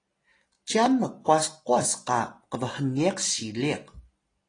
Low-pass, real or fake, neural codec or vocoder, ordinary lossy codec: 9.9 kHz; real; none; AAC, 32 kbps